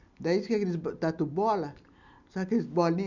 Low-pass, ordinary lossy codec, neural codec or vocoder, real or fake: 7.2 kHz; none; none; real